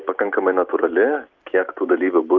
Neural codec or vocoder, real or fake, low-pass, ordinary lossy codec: none; real; 7.2 kHz; Opus, 32 kbps